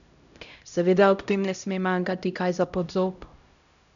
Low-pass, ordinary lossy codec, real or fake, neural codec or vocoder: 7.2 kHz; none; fake; codec, 16 kHz, 0.5 kbps, X-Codec, HuBERT features, trained on LibriSpeech